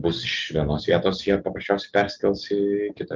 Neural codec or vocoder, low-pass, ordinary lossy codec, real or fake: none; 7.2 kHz; Opus, 32 kbps; real